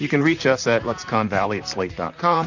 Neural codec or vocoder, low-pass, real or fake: vocoder, 44.1 kHz, 128 mel bands, Pupu-Vocoder; 7.2 kHz; fake